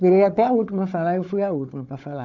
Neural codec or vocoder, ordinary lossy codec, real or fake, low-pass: codec, 16 kHz, 4 kbps, FunCodec, trained on Chinese and English, 50 frames a second; none; fake; 7.2 kHz